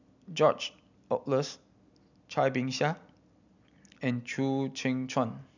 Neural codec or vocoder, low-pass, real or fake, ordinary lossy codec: none; 7.2 kHz; real; none